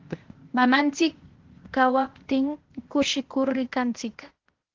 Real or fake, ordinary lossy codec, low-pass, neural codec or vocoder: fake; Opus, 16 kbps; 7.2 kHz; codec, 16 kHz, 0.8 kbps, ZipCodec